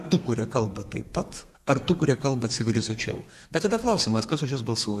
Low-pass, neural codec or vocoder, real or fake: 14.4 kHz; codec, 32 kHz, 1.9 kbps, SNAC; fake